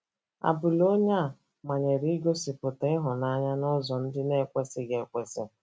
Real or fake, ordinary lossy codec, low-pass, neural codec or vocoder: real; none; none; none